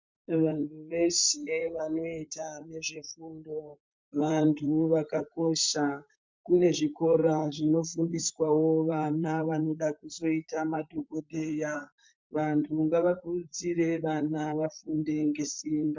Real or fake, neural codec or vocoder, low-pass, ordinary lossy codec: fake; vocoder, 44.1 kHz, 128 mel bands, Pupu-Vocoder; 7.2 kHz; MP3, 64 kbps